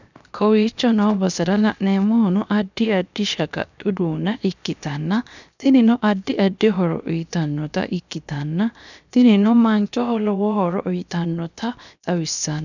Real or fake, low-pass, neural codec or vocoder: fake; 7.2 kHz; codec, 16 kHz, 0.7 kbps, FocalCodec